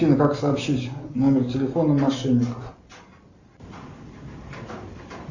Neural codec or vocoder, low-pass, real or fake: none; 7.2 kHz; real